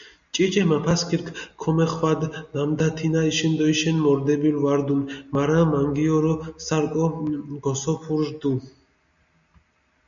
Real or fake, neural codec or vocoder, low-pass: real; none; 7.2 kHz